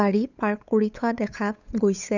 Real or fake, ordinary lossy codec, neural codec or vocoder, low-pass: fake; none; codec, 16 kHz, 8 kbps, FunCodec, trained on Chinese and English, 25 frames a second; 7.2 kHz